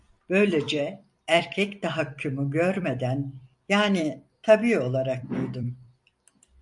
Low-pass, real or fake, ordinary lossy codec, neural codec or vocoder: 10.8 kHz; fake; MP3, 64 kbps; vocoder, 44.1 kHz, 128 mel bands every 256 samples, BigVGAN v2